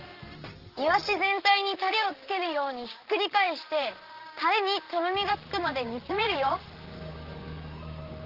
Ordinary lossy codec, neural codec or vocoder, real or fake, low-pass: Opus, 16 kbps; vocoder, 44.1 kHz, 128 mel bands, Pupu-Vocoder; fake; 5.4 kHz